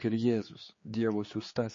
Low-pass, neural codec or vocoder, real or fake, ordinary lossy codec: 7.2 kHz; codec, 16 kHz, 4 kbps, X-Codec, HuBERT features, trained on general audio; fake; MP3, 32 kbps